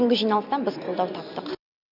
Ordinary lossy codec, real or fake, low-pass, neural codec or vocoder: none; real; 5.4 kHz; none